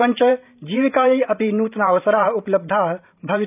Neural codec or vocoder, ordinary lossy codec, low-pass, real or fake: vocoder, 44.1 kHz, 128 mel bands every 512 samples, BigVGAN v2; none; 3.6 kHz; fake